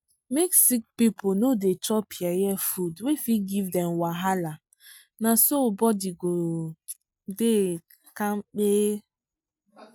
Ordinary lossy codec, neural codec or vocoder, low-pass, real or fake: none; none; none; real